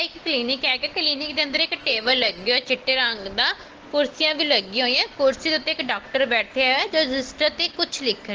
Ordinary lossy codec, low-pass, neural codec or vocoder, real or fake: Opus, 16 kbps; 7.2 kHz; none; real